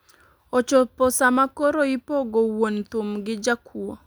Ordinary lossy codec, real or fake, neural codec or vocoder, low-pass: none; real; none; none